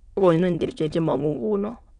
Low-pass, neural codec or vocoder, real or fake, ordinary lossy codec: 9.9 kHz; autoencoder, 22.05 kHz, a latent of 192 numbers a frame, VITS, trained on many speakers; fake; none